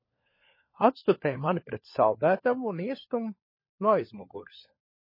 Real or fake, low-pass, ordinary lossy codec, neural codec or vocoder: fake; 5.4 kHz; MP3, 24 kbps; codec, 16 kHz, 4 kbps, FunCodec, trained on LibriTTS, 50 frames a second